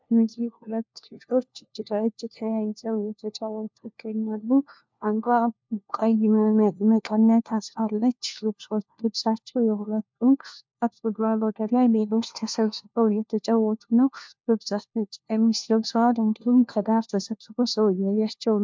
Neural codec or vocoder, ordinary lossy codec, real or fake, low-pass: codec, 16 kHz, 1 kbps, FunCodec, trained on LibriTTS, 50 frames a second; MP3, 64 kbps; fake; 7.2 kHz